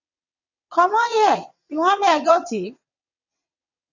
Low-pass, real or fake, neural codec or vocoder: 7.2 kHz; fake; vocoder, 22.05 kHz, 80 mel bands, WaveNeXt